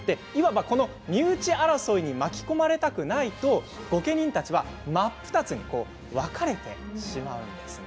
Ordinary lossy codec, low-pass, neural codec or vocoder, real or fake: none; none; none; real